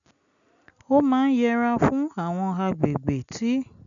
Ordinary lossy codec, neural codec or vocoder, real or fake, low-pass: none; none; real; 7.2 kHz